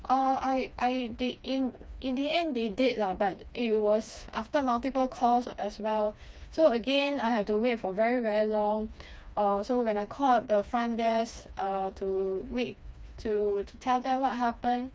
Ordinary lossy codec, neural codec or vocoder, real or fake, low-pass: none; codec, 16 kHz, 2 kbps, FreqCodec, smaller model; fake; none